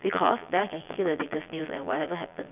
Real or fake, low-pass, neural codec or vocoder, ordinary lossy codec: fake; 3.6 kHz; vocoder, 22.05 kHz, 80 mel bands, Vocos; none